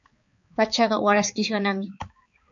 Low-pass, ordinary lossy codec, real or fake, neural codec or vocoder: 7.2 kHz; MP3, 48 kbps; fake; codec, 16 kHz, 4 kbps, X-Codec, HuBERT features, trained on balanced general audio